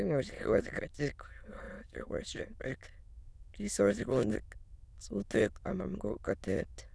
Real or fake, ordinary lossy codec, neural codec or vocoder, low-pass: fake; none; autoencoder, 22.05 kHz, a latent of 192 numbers a frame, VITS, trained on many speakers; none